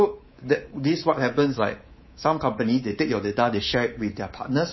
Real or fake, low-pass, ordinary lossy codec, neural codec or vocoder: fake; 7.2 kHz; MP3, 24 kbps; codec, 24 kHz, 3.1 kbps, DualCodec